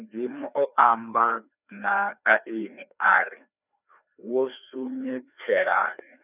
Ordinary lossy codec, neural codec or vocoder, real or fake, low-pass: none; codec, 16 kHz, 2 kbps, FreqCodec, larger model; fake; 3.6 kHz